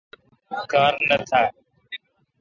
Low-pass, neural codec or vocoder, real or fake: 7.2 kHz; none; real